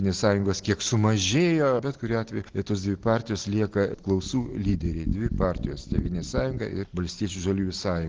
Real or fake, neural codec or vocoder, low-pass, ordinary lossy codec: real; none; 7.2 kHz; Opus, 16 kbps